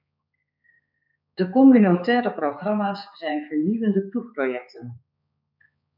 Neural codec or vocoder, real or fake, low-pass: codec, 16 kHz, 4 kbps, X-Codec, HuBERT features, trained on balanced general audio; fake; 5.4 kHz